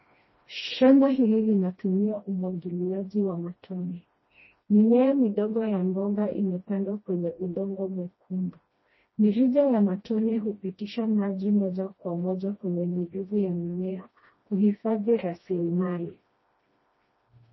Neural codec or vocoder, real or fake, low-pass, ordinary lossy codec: codec, 16 kHz, 1 kbps, FreqCodec, smaller model; fake; 7.2 kHz; MP3, 24 kbps